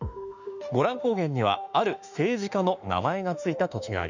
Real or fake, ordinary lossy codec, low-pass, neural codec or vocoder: fake; none; 7.2 kHz; autoencoder, 48 kHz, 32 numbers a frame, DAC-VAE, trained on Japanese speech